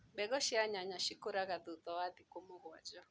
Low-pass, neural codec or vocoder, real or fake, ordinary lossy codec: none; none; real; none